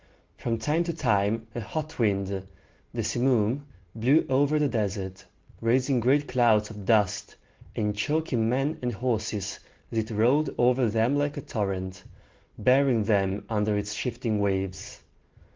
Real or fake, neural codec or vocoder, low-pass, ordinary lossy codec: real; none; 7.2 kHz; Opus, 16 kbps